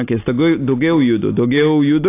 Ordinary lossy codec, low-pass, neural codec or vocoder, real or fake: AAC, 24 kbps; 3.6 kHz; none; real